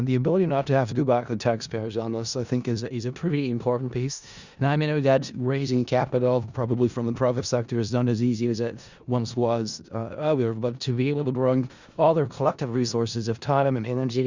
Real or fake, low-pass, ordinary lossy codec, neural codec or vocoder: fake; 7.2 kHz; Opus, 64 kbps; codec, 16 kHz in and 24 kHz out, 0.4 kbps, LongCat-Audio-Codec, four codebook decoder